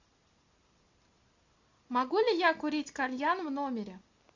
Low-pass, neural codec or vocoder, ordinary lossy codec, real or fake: 7.2 kHz; none; AAC, 48 kbps; real